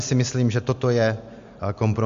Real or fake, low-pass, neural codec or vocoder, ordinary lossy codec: real; 7.2 kHz; none; MP3, 48 kbps